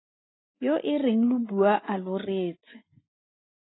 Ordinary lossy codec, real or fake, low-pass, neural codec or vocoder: AAC, 16 kbps; real; 7.2 kHz; none